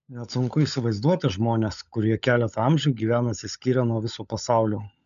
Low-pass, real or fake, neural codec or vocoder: 7.2 kHz; fake; codec, 16 kHz, 16 kbps, FunCodec, trained on LibriTTS, 50 frames a second